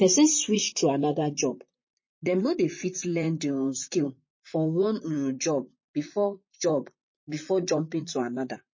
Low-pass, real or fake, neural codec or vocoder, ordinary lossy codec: 7.2 kHz; fake; vocoder, 44.1 kHz, 128 mel bands, Pupu-Vocoder; MP3, 32 kbps